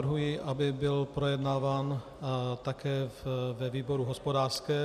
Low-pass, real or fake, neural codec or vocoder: 14.4 kHz; real; none